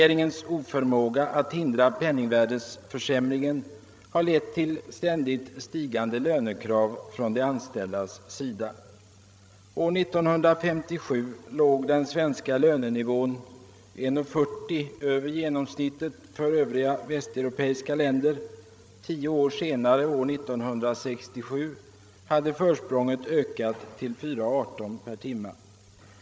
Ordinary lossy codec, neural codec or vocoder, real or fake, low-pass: none; codec, 16 kHz, 16 kbps, FreqCodec, larger model; fake; none